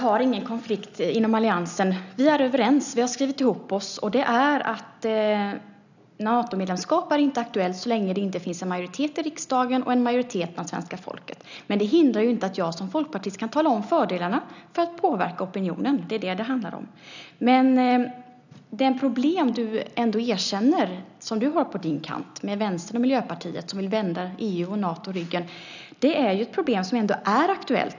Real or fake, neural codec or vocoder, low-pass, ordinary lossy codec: real; none; 7.2 kHz; none